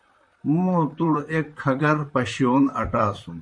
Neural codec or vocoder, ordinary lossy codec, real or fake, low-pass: vocoder, 44.1 kHz, 128 mel bands, Pupu-Vocoder; MP3, 64 kbps; fake; 9.9 kHz